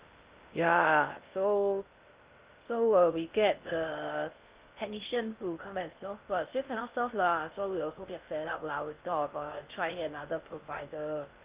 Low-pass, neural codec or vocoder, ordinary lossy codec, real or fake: 3.6 kHz; codec, 16 kHz in and 24 kHz out, 0.6 kbps, FocalCodec, streaming, 2048 codes; Opus, 24 kbps; fake